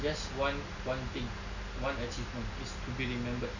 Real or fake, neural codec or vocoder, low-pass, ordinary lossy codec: real; none; 7.2 kHz; none